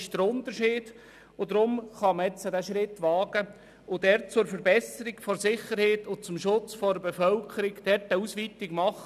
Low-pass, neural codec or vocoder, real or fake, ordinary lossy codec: 14.4 kHz; none; real; none